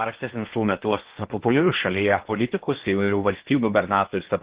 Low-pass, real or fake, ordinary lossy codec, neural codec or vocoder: 3.6 kHz; fake; Opus, 16 kbps; codec, 16 kHz in and 24 kHz out, 0.8 kbps, FocalCodec, streaming, 65536 codes